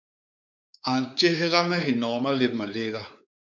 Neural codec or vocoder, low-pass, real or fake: codec, 16 kHz, 4 kbps, X-Codec, WavLM features, trained on Multilingual LibriSpeech; 7.2 kHz; fake